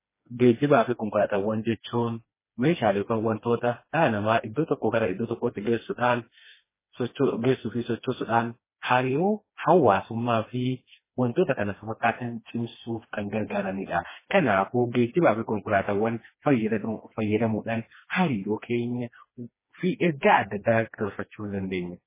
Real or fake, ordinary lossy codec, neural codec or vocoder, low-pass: fake; MP3, 16 kbps; codec, 16 kHz, 2 kbps, FreqCodec, smaller model; 3.6 kHz